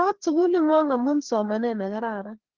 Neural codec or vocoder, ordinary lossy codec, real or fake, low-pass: codec, 16 kHz, 2 kbps, FreqCodec, larger model; Opus, 16 kbps; fake; 7.2 kHz